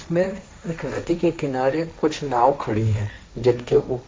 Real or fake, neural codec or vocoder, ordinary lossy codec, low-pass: fake; codec, 16 kHz, 1.1 kbps, Voila-Tokenizer; none; none